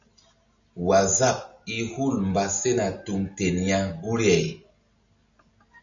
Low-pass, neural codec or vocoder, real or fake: 7.2 kHz; none; real